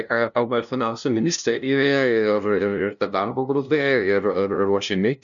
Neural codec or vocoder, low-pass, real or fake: codec, 16 kHz, 0.5 kbps, FunCodec, trained on LibriTTS, 25 frames a second; 7.2 kHz; fake